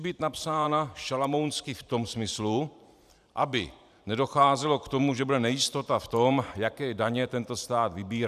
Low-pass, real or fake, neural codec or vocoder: 14.4 kHz; fake; vocoder, 44.1 kHz, 128 mel bands every 512 samples, BigVGAN v2